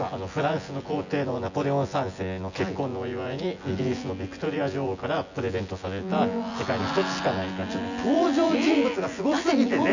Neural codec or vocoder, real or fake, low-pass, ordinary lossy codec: vocoder, 24 kHz, 100 mel bands, Vocos; fake; 7.2 kHz; none